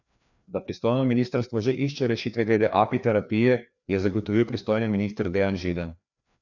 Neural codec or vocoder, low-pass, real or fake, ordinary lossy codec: codec, 16 kHz, 2 kbps, FreqCodec, larger model; 7.2 kHz; fake; none